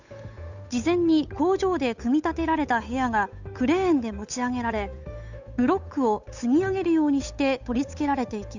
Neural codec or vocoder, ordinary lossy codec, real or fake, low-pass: codec, 16 kHz, 8 kbps, FunCodec, trained on Chinese and English, 25 frames a second; none; fake; 7.2 kHz